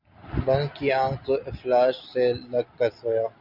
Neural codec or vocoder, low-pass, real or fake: none; 5.4 kHz; real